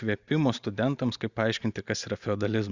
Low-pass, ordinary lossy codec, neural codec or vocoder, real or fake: 7.2 kHz; Opus, 64 kbps; none; real